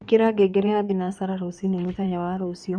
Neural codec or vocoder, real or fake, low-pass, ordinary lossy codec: codec, 16 kHz in and 24 kHz out, 2.2 kbps, FireRedTTS-2 codec; fake; 9.9 kHz; none